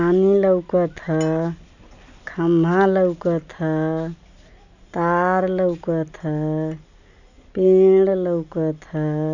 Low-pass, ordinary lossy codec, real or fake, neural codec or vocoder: 7.2 kHz; none; real; none